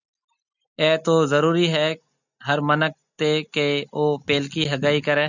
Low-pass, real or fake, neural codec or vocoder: 7.2 kHz; real; none